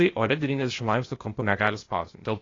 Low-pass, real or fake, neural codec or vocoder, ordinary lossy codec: 7.2 kHz; fake; codec, 16 kHz, 0.8 kbps, ZipCodec; AAC, 32 kbps